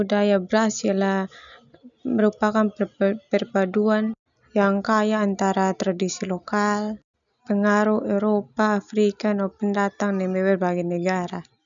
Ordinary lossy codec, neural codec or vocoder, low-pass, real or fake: none; none; 7.2 kHz; real